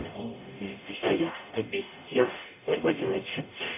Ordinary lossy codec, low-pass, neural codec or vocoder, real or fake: none; 3.6 kHz; codec, 44.1 kHz, 0.9 kbps, DAC; fake